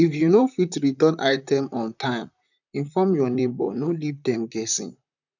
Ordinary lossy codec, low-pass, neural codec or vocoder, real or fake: none; 7.2 kHz; vocoder, 44.1 kHz, 128 mel bands, Pupu-Vocoder; fake